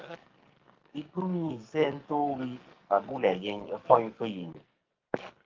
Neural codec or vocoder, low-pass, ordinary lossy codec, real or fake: codec, 44.1 kHz, 2.6 kbps, SNAC; 7.2 kHz; Opus, 32 kbps; fake